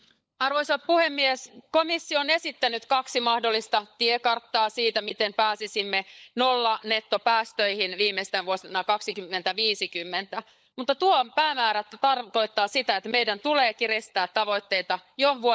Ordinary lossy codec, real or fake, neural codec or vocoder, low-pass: none; fake; codec, 16 kHz, 16 kbps, FunCodec, trained on LibriTTS, 50 frames a second; none